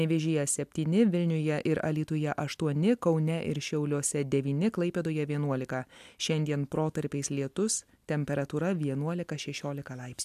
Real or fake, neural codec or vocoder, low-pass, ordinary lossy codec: real; none; 14.4 kHz; AAC, 96 kbps